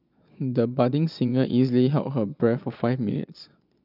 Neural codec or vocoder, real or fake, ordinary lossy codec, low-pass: vocoder, 22.05 kHz, 80 mel bands, Vocos; fake; none; 5.4 kHz